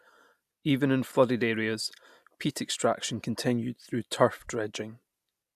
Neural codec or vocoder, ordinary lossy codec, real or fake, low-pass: none; AAC, 96 kbps; real; 14.4 kHz